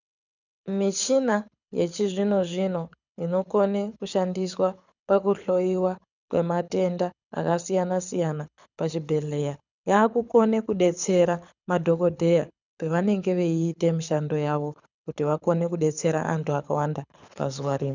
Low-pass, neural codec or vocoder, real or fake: 7.2 kHz; codec, 24 kHz, 6 kbps, HILCodec; fake